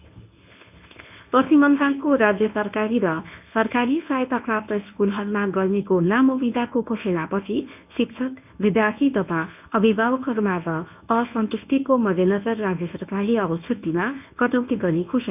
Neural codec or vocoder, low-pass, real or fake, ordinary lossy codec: codec, 24 kHz, 0.9 kbps, WavTokenizer, medium speech release version 1; 3.6 kHz; fake; none